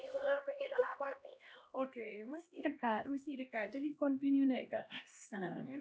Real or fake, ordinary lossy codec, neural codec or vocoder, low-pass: fake; none; codec, 16 kHz, 1 kbps, X-Codec, HuBERT features, trained on LibriSpeech; none